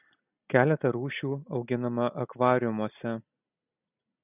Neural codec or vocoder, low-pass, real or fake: none; 3.6 kHz; real